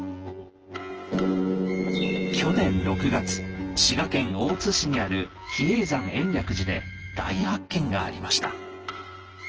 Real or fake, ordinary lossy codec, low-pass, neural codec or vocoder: fake; Opus, 16 kbps; 7.2 kHz; vocoder, 24 kHz, 100 mel bands, Vocos